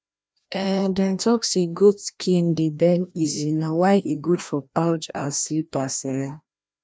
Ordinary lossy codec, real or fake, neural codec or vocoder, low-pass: none; fake; codec, 16 kHz, 1 kbps, FreqCodec, larger model; none